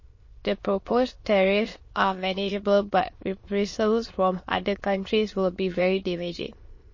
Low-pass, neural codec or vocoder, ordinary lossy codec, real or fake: 7.2 kHz; autoencoder, 22.05 kHz, a latent of 192 numbers a frame, VITS, trained on many speakers; MP3, 32 kbps; fake